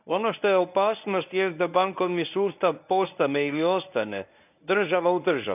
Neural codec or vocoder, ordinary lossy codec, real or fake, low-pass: codec, 24 kHz, 0.9 kbps, WavTokenizer, medium speech release version 1; none; fake; 3.6 kHz